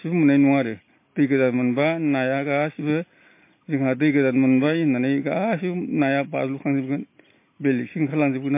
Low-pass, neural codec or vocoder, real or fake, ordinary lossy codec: 3.6 kHz; none; real; MP3, 24 kbps